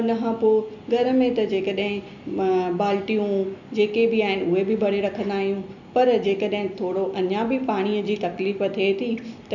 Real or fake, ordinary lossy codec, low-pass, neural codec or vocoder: real; none; 7.2 kHz; none